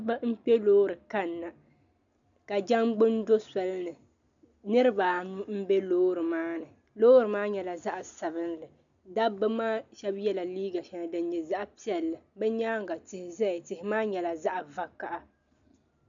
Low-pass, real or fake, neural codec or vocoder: 7.2 kHz; real; none